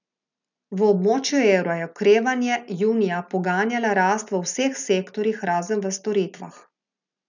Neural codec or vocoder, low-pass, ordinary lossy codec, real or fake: none; 7.2 kHz; none; real